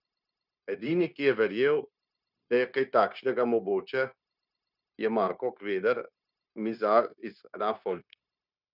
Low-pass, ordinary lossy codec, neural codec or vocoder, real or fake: 5.4 kHz; none; codec, 16 kHz, 0.9 kbps, LongCat-Audio-Codec; fake